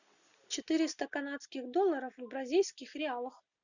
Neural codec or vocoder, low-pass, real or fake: none; 7.2 kHz; real